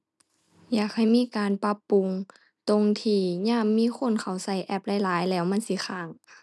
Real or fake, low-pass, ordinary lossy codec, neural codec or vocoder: real; none; none; none